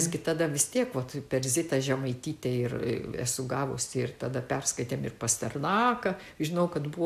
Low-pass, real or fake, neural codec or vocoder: 14.4 kHz; real; none